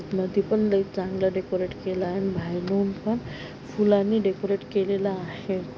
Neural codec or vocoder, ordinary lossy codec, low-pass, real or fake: none; none; none; real